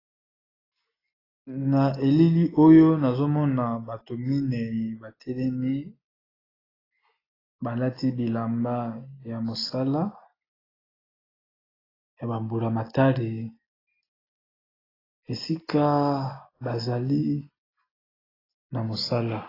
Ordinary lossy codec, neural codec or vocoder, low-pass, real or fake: AAC, 24 kbps; none; 5.4 kHz; real